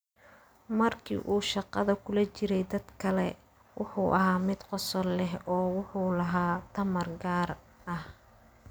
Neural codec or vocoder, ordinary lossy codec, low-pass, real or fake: none; none; none; real